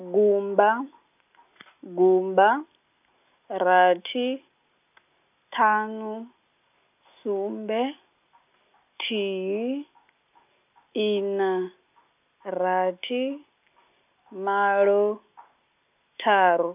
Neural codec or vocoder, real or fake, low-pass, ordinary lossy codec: none; real; 3.6 kHz; none